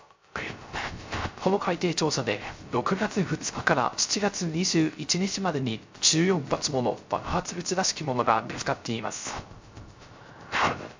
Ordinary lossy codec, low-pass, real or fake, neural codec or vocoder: MP3, 64 kbps; 7.2 kHz; fake; codec, 16 kHz, 0.3 kbps, FocalCodec